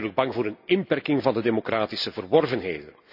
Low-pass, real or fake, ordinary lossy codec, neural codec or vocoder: 5.4 kHz; real; AAC, 48 kbps; none